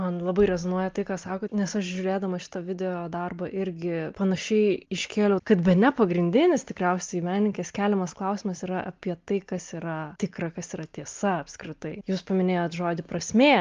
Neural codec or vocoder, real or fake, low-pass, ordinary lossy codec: none; real; 7.2 kHz; Opus, 32 kbps